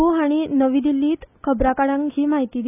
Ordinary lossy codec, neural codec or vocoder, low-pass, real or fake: none; none; 3.6 kHz; real